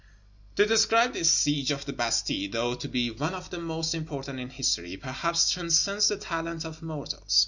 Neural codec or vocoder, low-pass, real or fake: none; 7.2 kHz; real